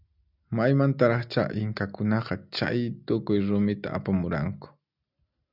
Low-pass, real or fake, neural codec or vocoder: 5.4 kHz; real; none